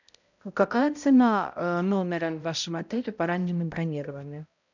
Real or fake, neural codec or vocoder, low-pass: fake; codec, 16 kHz, 0.5 kbps, X-Codec, HuBERT features, trained on balanced general audio; 7.2 kHz